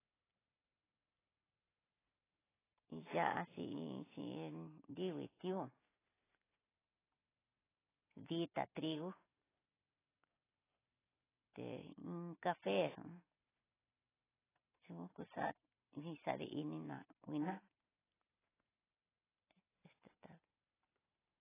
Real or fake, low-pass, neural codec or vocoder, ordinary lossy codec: real; 3.6 kHz; none; AAC, 16 kbps